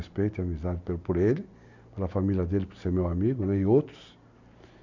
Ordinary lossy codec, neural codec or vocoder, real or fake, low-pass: none; none; real; 7.2 kHz